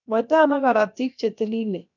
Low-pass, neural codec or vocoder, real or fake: 7.2 kHz; codec, 16 kHz, about 1 kbps, DyCAST, with the encoder's durations; fake